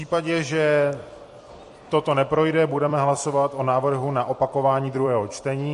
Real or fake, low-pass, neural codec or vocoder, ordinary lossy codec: fake; 14.4 kHz; vocoder, 44.1 kHz, 128 mel bands every 256 samples, BigVGAN v2; MP3, 48 kbps